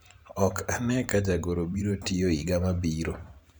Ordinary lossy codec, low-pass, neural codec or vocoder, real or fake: none; none; vocoder, 44.1 kHz, 128 mel bands every 512 samples, BigVGAN v2; fake